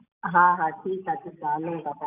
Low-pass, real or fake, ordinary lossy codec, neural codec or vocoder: 3.6 kHz; real; none; none